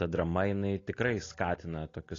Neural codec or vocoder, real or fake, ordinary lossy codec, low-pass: none; real; AAC, 32 kbps; 7.2 kHz